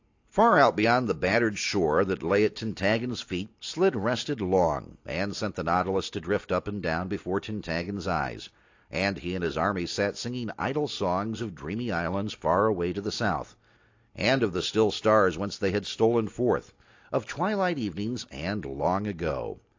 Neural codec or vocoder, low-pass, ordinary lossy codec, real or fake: none; 7.2 kHz; AAC, 48 kbps; real